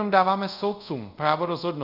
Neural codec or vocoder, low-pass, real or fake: codec, 24 kHz, 0.5 kbps, DualCodec; 5.4 kHz; fake